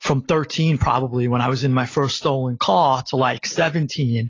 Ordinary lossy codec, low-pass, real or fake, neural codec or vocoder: AAC, 32 kbps; 7.2 kHz; real; none